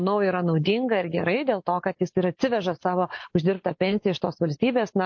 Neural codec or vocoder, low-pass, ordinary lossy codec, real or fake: none; 7.2 kHz; MP3, 48 kbps; real